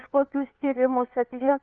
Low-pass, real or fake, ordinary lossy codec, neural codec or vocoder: 7.2 kHz; fake; Opus, 24 kbps; codec, 16 kHz, 4 kbps, FunCodec, trained on LibriTTS, 50 frames a second